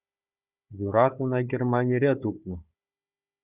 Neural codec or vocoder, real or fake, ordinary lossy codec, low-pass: codec, 16 kHz, 4 kbps, FunCodec, trained on Chinese and English, 50 frames a second; fake; Opus, 64 kbps; 3.6 kHz